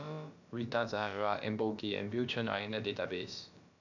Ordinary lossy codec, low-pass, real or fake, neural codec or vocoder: none; 7.2 kHz; fake; codec, 16 kHz, about 1 kbps, DyCAST, with the encoder's durations